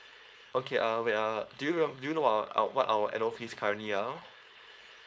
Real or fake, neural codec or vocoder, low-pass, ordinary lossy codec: fake; codec, 16 kHz, 4.8 kbps, FACodec; none; none